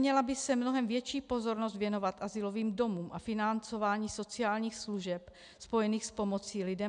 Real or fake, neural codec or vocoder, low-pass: real; none; 9.9 kHz